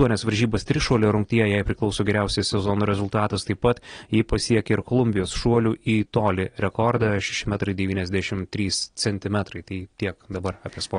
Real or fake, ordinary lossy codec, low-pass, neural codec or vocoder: real; AAC, 32 kbps; 9.9 kHz; none